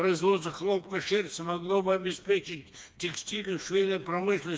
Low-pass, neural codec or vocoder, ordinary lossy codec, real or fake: none; codec, 16 kHz, 2 kbps, FreqCodec, smaller model; none; fake